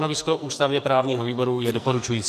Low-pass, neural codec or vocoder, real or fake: 14.4 kHz; codec, 44.1 kHz, 2.6 kbps, SNAC; fake